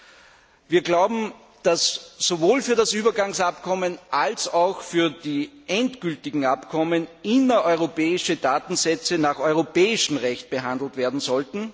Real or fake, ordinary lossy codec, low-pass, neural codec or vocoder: real; none; none; none